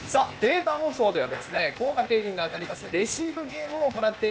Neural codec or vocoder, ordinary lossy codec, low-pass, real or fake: codec, 16 kHz, 0.8 kbps, ZipCodec; none; none; fake